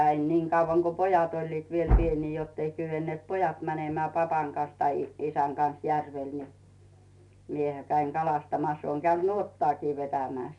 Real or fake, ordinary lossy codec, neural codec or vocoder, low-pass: real; none; none; 10.8 kHz